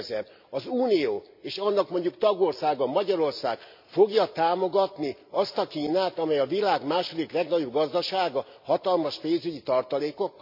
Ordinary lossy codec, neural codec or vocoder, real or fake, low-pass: MP3, 48 kbps; none; real; 5.4 kHz